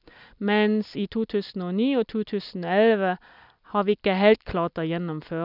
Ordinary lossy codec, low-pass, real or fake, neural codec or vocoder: none; 5.4 kHz; real; none